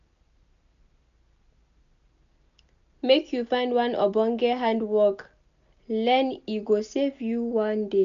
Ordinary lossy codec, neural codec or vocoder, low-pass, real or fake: none; none; 7.2 kHz; real